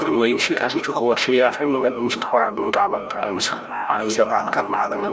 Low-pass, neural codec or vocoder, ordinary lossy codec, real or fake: none; codec, 16 kHz, 0.5 kbps, FreqCodec, larger model; none; fake